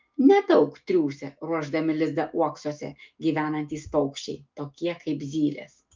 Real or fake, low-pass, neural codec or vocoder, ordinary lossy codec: fake; 7.2 kHz; autoencoder, 48 kHz, 128 numbers a frame, DAC-VAE, trained on Japanese speech; Opus, 24 kbps